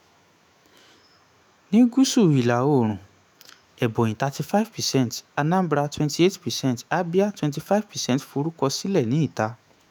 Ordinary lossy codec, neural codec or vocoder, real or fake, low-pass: none; autoencoder, 48 kHz, 128 numbers a frame, DAC-VAE, trained on Japanese speech; fake; none